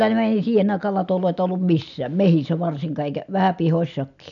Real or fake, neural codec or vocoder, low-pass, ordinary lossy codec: real; none; 7.2 kHz; none